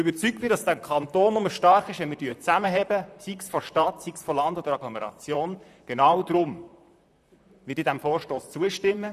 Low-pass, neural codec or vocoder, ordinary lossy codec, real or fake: 14.4 kHz; vocoder, 44.1 kHz, 128 mel bands, Pupu-Vocoder; none; fake